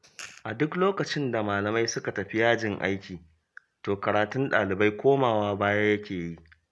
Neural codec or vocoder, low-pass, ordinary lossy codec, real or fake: none; none; none; real